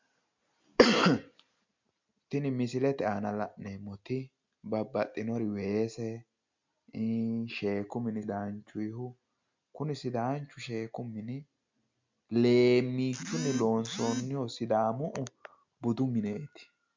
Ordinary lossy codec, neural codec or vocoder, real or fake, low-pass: MP3, 64 kbps; none; real; 7.2 kHz